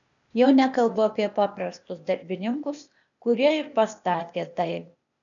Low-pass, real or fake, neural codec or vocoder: 7.2 kHz; fake; codec, 16 kHz, 0.8 kbps, ZipCodec